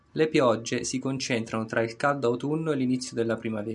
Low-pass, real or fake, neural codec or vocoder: 10.8 kHz; real; none